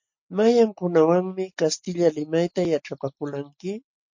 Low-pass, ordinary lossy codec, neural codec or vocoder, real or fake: 7.2 kHz; MP3, 48 kbps; none; real